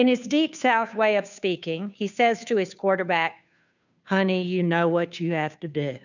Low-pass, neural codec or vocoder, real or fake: 7.2 kHz; codec, 16 kHz, 2 kbps, FunCodec, trained on Chinese and English, 25 frames a second; fake